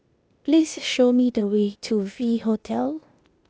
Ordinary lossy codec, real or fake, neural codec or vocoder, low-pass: none; fake; codec, 16 kHz, 0.8 kbps, ZipCodec; none